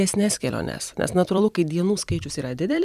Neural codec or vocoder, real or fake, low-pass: vocoder, 44.1 kHz, 128 mel bands every 256 samples, BigVGAN v2; fake; 14.4 kHz